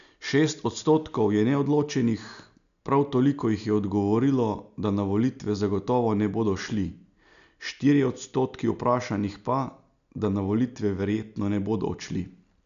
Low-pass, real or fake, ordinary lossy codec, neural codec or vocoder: 7.2 kHz; real; none; none